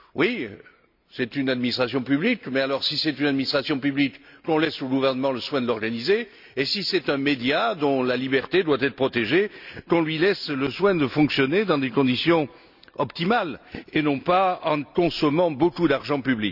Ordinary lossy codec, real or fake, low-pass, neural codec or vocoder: none; real; 5.4 kHz; none